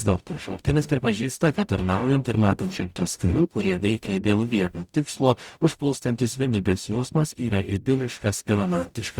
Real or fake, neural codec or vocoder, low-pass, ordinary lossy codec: fake; codec, 44.1 kHz, 0.9 kbps, DAC; 19.8 kHz; Opus, 64 kbps